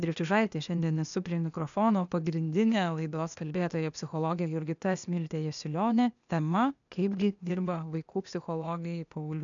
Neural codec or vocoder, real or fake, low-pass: codec, 16 kHz, 0.8 kbps, ZipCodec; fake; 7.2 kHz